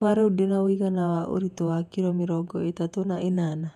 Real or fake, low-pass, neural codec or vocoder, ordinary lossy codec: fake; 14.4 kHz; vocoder, 48 kHz, 128 mel bands, Vocos; none